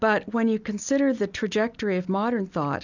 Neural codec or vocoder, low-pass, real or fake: none; 7.2 kHz; real